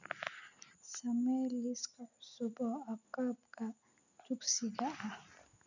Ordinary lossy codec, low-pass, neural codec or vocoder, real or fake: none; 7.2 kHz; none; real